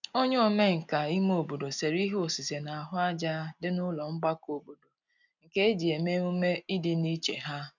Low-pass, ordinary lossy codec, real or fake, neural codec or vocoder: 7.2 kHz; none; real; none